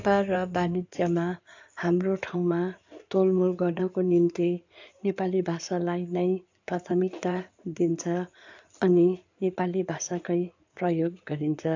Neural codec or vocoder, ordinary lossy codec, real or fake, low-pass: codec, 16 kHz in and 24 kHz out, 2.2 kbps, FireRedTTS-2 codec; AAC, 48 kbps; fake; 7.2 kHz